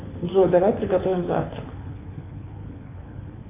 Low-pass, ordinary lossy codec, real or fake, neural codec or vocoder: 3.6 kHz; AAC, 16 kbps; fake; codec, 24 kHz, 6 kbps, HILCodec